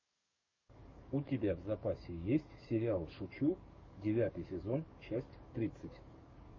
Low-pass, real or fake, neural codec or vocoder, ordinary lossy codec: 7.2 kHz; fake; codec, 44.1 kHz, 7.8 kbps, DAC; MP3, 32 kbps